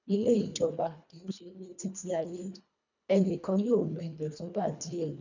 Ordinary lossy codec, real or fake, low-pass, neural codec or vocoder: none; fake; 7.2 kHz; codec, 24 kHz, 1.5 kbps, HILCodec